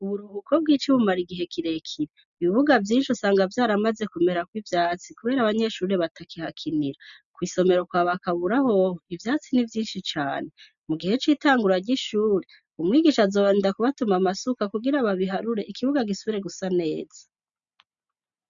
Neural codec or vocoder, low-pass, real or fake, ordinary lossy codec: none; 7.2 kHz; real; MP3, 96 kbps